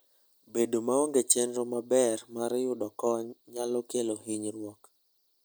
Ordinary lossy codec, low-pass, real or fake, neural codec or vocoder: none; none; real; none